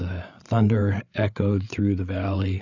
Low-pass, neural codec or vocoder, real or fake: 7.2 kHz; codec, 16 kHz, 8 kbps, FreqCodec, larger model; fake